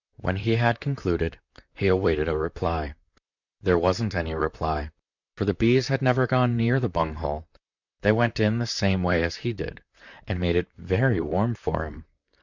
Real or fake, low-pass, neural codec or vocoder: fake; 7.2 kHz; vocoder, 44.1 kHz, 128 mel bands, Pupu-Vocoder